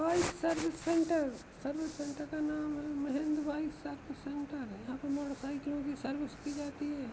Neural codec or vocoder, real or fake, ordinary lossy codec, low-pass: none; real; none; none